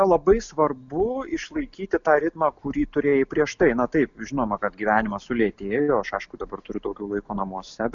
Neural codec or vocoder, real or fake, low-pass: none; real; 7.2 kHz